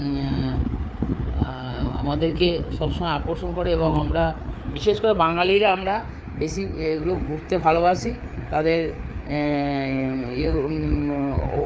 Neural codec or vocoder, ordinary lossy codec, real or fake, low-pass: codec, 16 kHz, 4 kbps, FreqCodec, larger model; none; fake; none